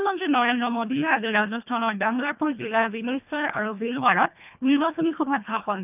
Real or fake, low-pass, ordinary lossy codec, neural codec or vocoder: fake; 3.6 kHz; none; codec, 24 kHz, 1.5 kbps, HILCodec